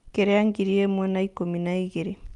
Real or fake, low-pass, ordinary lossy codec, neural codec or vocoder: real; 10.8 kHz; Opus, 32 kbps; none